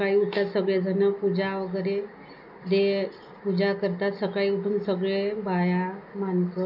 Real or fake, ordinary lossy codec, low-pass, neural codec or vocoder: real; none; 5.4 kHz; none